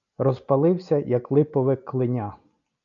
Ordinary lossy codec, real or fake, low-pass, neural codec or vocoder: MP3, 96 kbps; real; 7.2 kHz; none